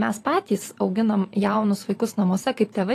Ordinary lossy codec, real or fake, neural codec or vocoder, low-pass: AAC, 48 kbps; fake; vocoder, 44.1 kHz, 128 mel bands every 512 samples, BigVGAN v2; 14.4 kHz